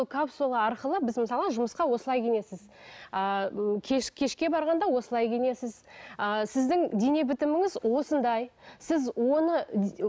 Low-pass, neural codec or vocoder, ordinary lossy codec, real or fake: none; none; none; real